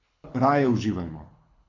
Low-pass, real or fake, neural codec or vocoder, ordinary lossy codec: 7.2 kHz; fake; codec, 24 kHz, 6 kbps, HILCodec; AAC, 48 kbps